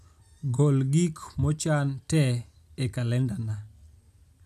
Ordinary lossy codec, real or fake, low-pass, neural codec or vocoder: none; real; 14.4 kHz; none